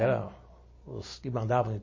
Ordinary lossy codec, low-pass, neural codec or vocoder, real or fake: MP3, 32 kbps; 7.2 kHz; none; real